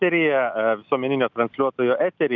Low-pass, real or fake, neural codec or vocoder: 7.2 kHz; real; none